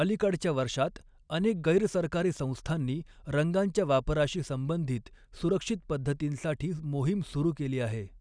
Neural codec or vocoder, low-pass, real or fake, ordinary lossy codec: none; 9.9 kHz; real; none